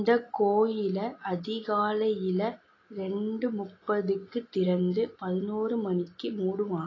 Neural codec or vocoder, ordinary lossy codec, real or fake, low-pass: none; AAC, 32 kbps; real; 7.2 kHz